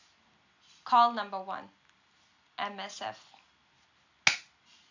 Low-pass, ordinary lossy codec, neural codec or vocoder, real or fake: 7.2 kHz; AAC, 48 kbps; none; real